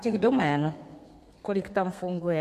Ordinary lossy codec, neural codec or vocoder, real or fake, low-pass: MP3, 64 kbps; codec, 32 kHz, 1.9 kbps, SNAC; fake; 14.4 kHz